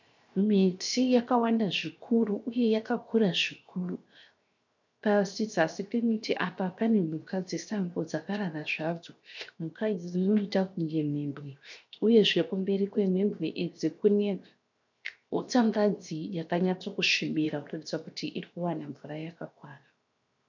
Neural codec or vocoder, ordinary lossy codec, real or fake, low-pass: codec, 16 kHz, 0.7 kbps, FocalCodec; MP3, 64 kbps; fake; 7.2 kHz